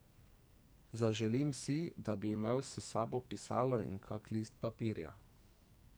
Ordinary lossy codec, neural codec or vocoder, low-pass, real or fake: none; codec, 44.1 kHz, 2.6 kbps, SNAC; none; fake